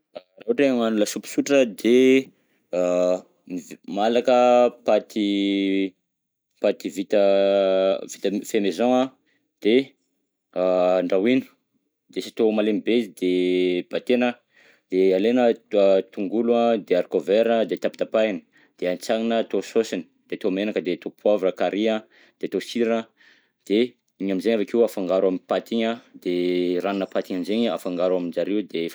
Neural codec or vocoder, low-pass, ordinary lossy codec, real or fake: none; none; none; real